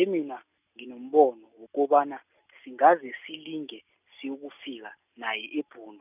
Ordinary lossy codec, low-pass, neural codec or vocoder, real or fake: none; 3.6 kHz; none; real